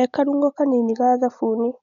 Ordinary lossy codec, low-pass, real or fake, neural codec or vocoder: none; 7.2 kHz; real; none